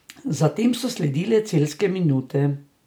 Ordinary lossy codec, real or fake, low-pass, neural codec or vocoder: none; real; none; none